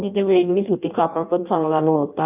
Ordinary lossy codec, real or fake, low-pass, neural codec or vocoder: none; fake; 3.6 kHz; codec, 16 kHz in and 24 kHz out, 0.6 kbps, FireRedTTS-2 codec